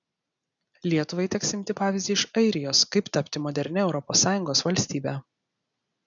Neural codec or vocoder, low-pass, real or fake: none; 7.2 kHz; real